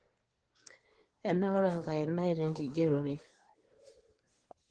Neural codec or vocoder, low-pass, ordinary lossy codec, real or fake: codec, 24 kHz, 1 kbps, SNAC; 9.9 kHz; Opus, 16 kbps; fake